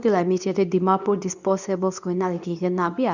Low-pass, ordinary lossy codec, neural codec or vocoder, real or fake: 7.2 kHz; none; codec, 24 kHz, 0.9 kbps, WavTokenizer, medium speech release version 2; fake